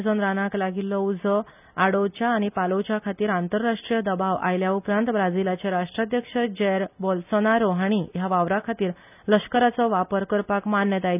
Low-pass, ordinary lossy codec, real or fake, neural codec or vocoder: 3.6 kHz; none; real; none